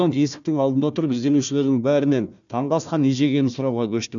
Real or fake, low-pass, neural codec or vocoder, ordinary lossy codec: fake; 7.2 kHz; codec, 16 kHz, 1 kbps, FunCodec, trained on Chinese and English, 50 frames a second; none